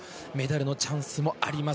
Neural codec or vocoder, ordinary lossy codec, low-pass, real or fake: none; none; none; real